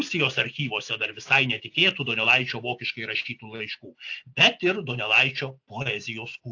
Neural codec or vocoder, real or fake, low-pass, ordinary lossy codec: none; real; 7.2 kHz; AAC, 48 kbps